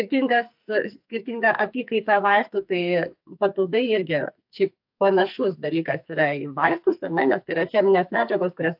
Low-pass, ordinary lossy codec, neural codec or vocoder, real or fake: 5.4 kHz; AAC, 48 kbps; codec, 44.1 kHz, 2.6 kbps, SNAC; fake